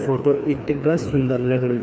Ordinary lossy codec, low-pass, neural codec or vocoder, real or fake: none; none; codec, 16 kHz, 2 kbps, FreqCodec, larger model; fake